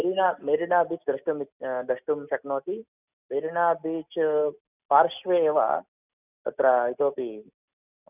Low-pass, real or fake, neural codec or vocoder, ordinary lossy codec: 3.6 kHz; real; none; none